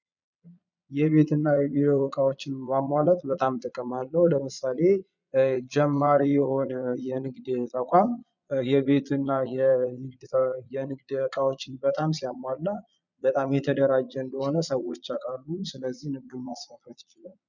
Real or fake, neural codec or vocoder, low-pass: fake; vocoder, 22.05 kHz, 80 mel bands, Vocos; 7.2 kHz